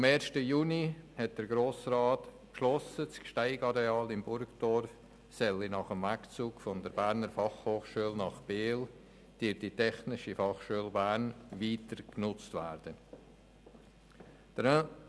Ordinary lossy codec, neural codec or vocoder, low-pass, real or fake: none; none; none; real